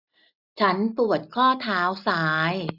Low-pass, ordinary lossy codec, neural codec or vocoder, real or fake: 5.4 kHz; none; none; real